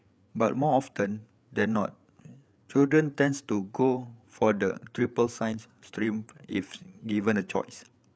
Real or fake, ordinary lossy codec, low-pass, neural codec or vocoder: fake; none; none; codec, 16 kHz, 8 kbps, FreqCodec, larger model